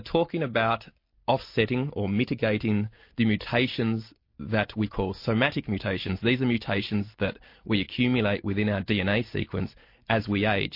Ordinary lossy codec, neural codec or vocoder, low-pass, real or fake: MP3, 32 kbps; none; 5.4 kHz; real